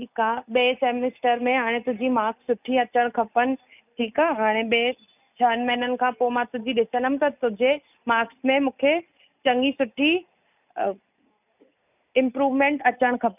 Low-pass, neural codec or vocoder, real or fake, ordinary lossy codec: 3.6 kHz; none; real; none